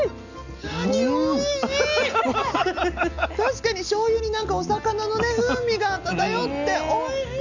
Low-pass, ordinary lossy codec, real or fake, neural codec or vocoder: 7.2 kHz; none; real; none